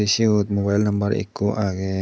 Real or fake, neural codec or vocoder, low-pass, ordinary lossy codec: real; none; none; none